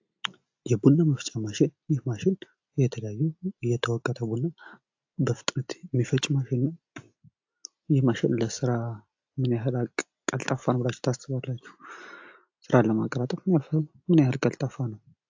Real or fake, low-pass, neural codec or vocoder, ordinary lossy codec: real; 7.2 kHz; none; AAC, 48 kbps